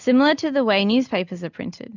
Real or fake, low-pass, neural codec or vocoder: real; 7.2 kHz; none